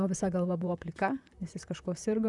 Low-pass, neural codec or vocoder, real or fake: 10.8 kHz; vocoder, 44.1 kHz, 128 mel bands, Pupu-Vocoder; fake